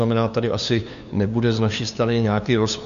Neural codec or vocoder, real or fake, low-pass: codec, 16 kHz, 2 kbps, FunCodec, trained on LibriTTS, 25 frames a second; fake; 7.2 kHz